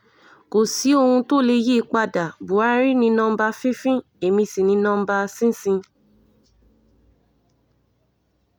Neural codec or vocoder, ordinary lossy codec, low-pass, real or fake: none; none; none; real